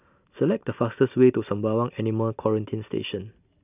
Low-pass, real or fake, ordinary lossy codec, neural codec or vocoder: 3.6 kHz; real; none; none